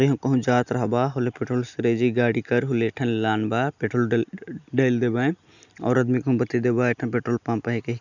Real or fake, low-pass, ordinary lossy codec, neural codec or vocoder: real; 7.2 kHz; none; none